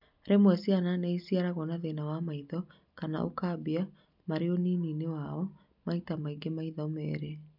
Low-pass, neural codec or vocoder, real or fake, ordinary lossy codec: 5.4 kHz; none; real; none